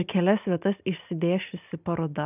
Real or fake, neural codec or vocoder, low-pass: real; none; 3.6 kHz